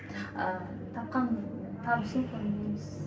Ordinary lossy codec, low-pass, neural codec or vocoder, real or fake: none; none; none; real